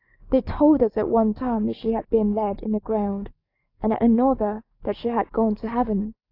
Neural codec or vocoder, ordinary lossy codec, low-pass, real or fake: codec, 44.1 kHz, 7.8 kbps, DAC; AAC, 32 kbps; 5.4 kHz; fake